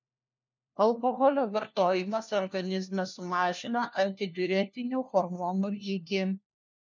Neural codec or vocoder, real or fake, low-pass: codec, 16 kHz, 1 kbps, FunCodec, trained on LibriTTS, 50 frames a second; fake; 7.2 kHz